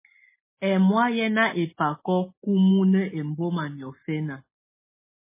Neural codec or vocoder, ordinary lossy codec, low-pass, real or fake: none; MP3, 16 kbps; 3.6 kHz; real